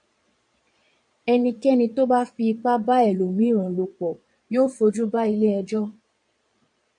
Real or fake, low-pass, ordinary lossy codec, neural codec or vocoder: fake; 9.9 kHz; MP3, 64 kbps; vocoder, 22.05 kHz, 80 mel bands, Vocos